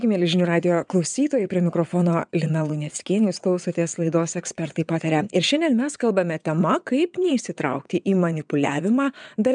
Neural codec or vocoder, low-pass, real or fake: vocoder, 22.05 kHz, 80 mel bands, WaveNeXt; 9.9 kHz; fake